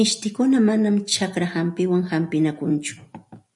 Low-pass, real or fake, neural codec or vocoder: 10.8 kHz; real; none